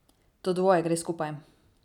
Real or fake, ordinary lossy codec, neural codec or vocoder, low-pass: real; none; none; 19.8 kHz